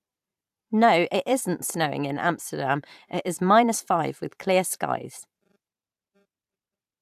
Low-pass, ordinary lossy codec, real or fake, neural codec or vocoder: 14.4 kHz; AAC, 96 kbps; fake; vocoder, 44.1 kHz, 128 mel bands every 512 samples, BigVGAN v2